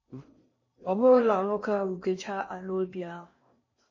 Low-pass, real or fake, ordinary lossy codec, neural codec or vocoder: 7.2 kHz; fake; MP3, 32 kbps; codec, 16 kHz in and 24 kHz out, 0.6 kbps, FocalCodec, streaming, 4096 codes